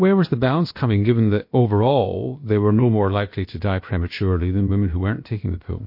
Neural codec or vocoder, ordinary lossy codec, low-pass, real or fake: codec, 16 kHz, about 1 kbps, DyCAST, with the encoder's durations; MP3, 32 kbps; 5.4 kHz; fake